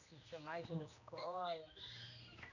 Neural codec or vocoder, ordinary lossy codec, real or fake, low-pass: codec, 16 kHz, 1 kbps, X-Codec, HuBERT features, trained on general audio; none; fake; 7.2 kHz